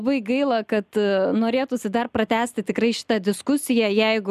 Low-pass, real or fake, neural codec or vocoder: 14.4 kHz; real; none